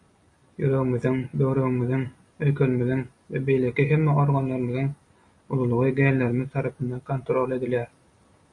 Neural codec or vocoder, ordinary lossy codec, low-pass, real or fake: none; MP3, 48 kbps; 10.8 kHz; real